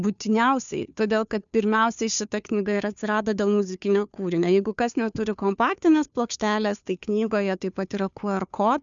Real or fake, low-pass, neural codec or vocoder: fake; 7.2 kHz; codec, 16 kHz, 2 kbps, FunCodec, trained on Chinese and English, 25 frames a second